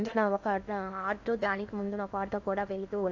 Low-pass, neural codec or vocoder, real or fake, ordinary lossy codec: 7.2 kHz; codec, 16 kHz in and 24 kHz out, 0.8 kbps, FocalCodec, streaming, 65536 codes; fake; AAC, 48 kbps